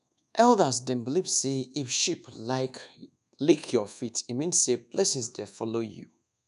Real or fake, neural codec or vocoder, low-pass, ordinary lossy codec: fake; codec, 24 kHz, 1.2 kbps, DualCodec; 10.8 kHz; none